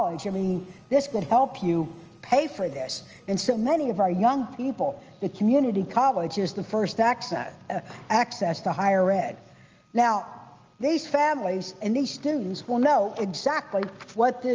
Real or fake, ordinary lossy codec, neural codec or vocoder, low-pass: real; Opus, 24 kbps; none; 7.2 kHz